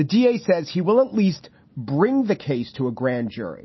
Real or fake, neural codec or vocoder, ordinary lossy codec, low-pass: real; none; MP3, 24 kbps; 7.2 kHz